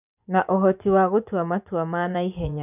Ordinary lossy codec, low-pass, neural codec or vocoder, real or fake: none; 3.6 kHz; vocoder, 24 kHz, 100 mel bands, Vocos; fake